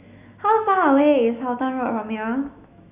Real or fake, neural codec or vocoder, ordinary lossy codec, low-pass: fake; autoencoder, 48 kHz, 128 numbers a frame, DAC-VAE, trained on Japanese speech; Opus, 64 kbps; 3.6 kHz